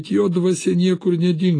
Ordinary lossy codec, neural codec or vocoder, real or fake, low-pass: AAC, 32 kbps; none; real; 9.9 kHz